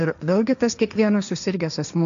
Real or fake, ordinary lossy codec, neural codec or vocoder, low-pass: fake; MP3, 96 kbps; codec, 16 kHz, 1.1 kbps, Voila-Tokenizer; 7.2 kHz